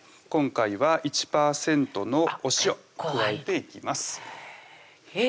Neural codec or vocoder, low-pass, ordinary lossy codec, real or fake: none; none; none; real